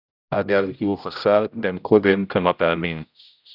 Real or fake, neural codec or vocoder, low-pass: fake; codec, 16 kHz, 0.5 kbps, X-Codec, HuBERT features, trained on general audio; 5.4 kHz